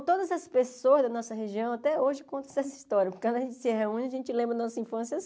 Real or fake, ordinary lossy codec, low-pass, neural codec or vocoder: real; none; none; none